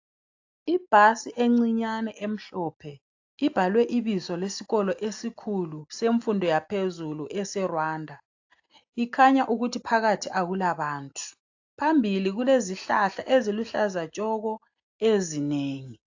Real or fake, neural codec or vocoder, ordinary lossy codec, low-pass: real; none; AAC, 48 kbps; 7.2 kHz